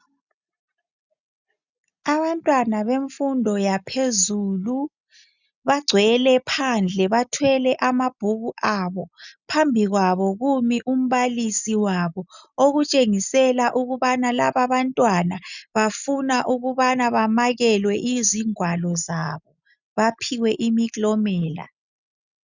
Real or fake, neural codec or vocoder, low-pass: real; none; 7.2 kHz